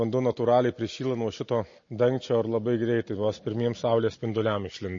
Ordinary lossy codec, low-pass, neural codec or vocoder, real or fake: MP3, 32 kbps; 7.2 kHz; none; real